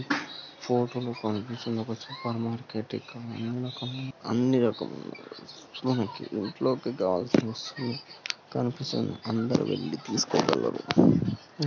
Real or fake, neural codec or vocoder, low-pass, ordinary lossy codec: real; none; 7.2 kHz; none